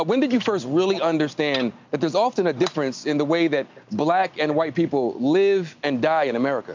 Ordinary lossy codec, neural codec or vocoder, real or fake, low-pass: MP3, 64 kbps; none; real; 7.2 kHz